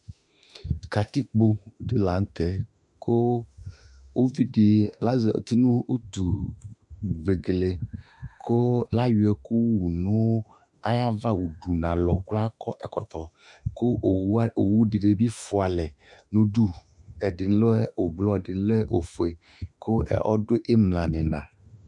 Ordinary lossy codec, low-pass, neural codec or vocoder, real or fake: MP3, 96 kbps; 10.8 kHz; autoencoder, 48 kHz, 32 numbers a frame, DAC-VAE, trained on Japanese speech; fake